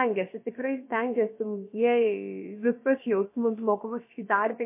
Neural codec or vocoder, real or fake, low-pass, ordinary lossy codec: codec, 16 kHz, about 1 kbps, DyCAST, with the encoder's durations; fake; 3.6 kHz; MP3, 24 kbps